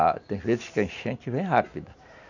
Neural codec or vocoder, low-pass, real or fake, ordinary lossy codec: none; 7.2 kHz; real; none